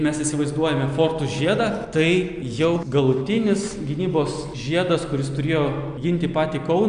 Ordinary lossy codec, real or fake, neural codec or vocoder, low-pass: AAC, 96 kbps; real; none; 9.9 kHz